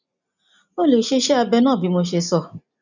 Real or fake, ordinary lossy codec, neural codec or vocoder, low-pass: real; none; none; 7.2 kHz